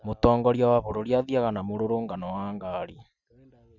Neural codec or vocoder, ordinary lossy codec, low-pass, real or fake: none; none; 7.2 kHz; real